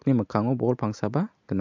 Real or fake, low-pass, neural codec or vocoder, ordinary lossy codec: real; 7.2 kHz; none; MP3, 64 kbps